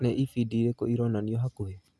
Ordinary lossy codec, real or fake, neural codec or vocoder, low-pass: none; real; none; none